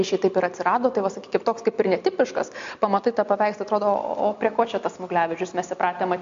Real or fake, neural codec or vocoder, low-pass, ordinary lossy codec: real; none; 7.2 kHz; MP3, 48 kbps